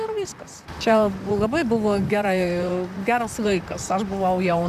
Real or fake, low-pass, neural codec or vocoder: fake; 14.4 kHz; vocoder, 44.1 kHz, 128 mel bands, Pupu-Vocoder